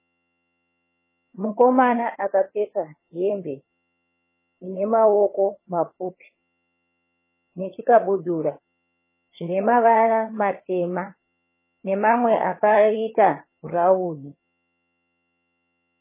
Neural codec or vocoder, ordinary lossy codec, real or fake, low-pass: vocoder, 22.05 kHz, 80 mel bands, HiFi-GAN; MP3, 16 kbps; fake; 3.6 kHz